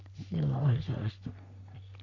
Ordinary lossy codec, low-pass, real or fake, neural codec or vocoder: none; 7.2 kHz; fake; codec, 44.1 kHz, 3.4 kbps, Pupu-Codec